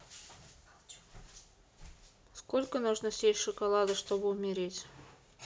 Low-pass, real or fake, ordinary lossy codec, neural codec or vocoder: none; real; none; none